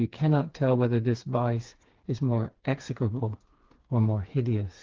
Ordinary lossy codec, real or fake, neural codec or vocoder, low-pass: Opus, 16 kbps; fake; codec, 16 kHz, 4 kbps, FreqCodec, smaller model; 7.2 kHz